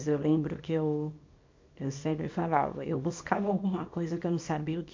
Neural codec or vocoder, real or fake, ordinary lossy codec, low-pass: codec, 24 kHz, 0.9 kbps, WavTokenizer, small release; fake; MP3, 48 kbps; 7.2 kHz